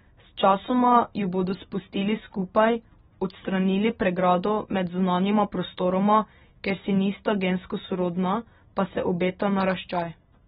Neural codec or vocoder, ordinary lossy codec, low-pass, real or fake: none; AAC, 16 kbps; 10.8 kHz; real